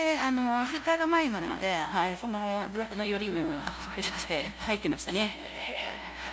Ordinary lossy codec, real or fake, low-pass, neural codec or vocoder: none; fake; none; codec, 16 kHz, 0.5 kbps, FunCodec, trained on LibriTTS, 25 frames a second